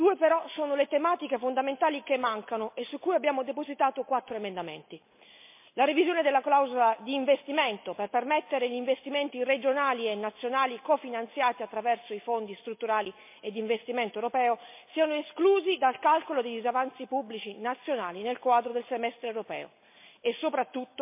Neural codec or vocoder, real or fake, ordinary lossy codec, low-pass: none; real; MP3, 24 kbps; 3.6 kHz